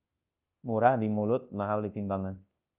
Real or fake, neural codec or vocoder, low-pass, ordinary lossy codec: fake; codec, 24 kHz, 0.9 kbps, WavTokenizer, large speech release; 3.6 kHz; Opus, 32 kbps